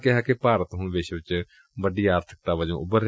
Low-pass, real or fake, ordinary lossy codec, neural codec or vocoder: none; real; none; none